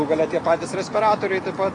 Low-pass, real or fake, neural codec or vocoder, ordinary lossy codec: 10.8 kHz; real; none; AAC, 48 kbps